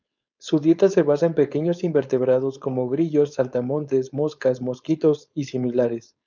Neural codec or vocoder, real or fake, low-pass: codec, 16 kHz, 4.8 kbps, FACodec; fake; 7.2 kHz